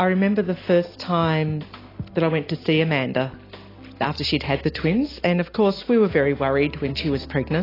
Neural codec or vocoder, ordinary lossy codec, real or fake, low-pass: none; AAC, 24 kbps; real; 5.4 kHz